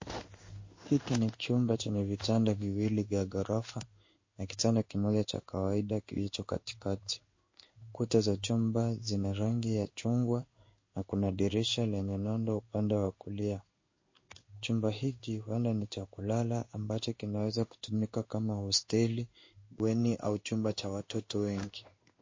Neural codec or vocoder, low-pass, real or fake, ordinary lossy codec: codec, 16 kHz in and 24 kHz out, 1 kbps, XY-Tokenizer; 7.2 kHz; fake; MP3, 32 kbps